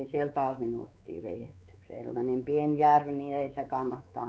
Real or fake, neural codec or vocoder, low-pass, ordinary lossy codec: fake; codec, 16 kHz, 4 kbps, X-Codec, WavLM features, trained on Multilingual LibriSpeech; none; none